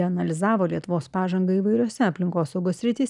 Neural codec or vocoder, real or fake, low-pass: none; real; 10.8 kHz